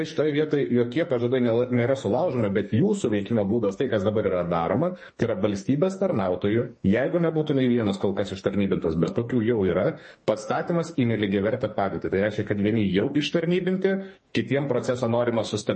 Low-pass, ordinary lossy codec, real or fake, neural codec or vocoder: 10.8 kHz; MP3, 32 kbps; fake; codec, 44.1 kHz, 2.6 kbps, SNAC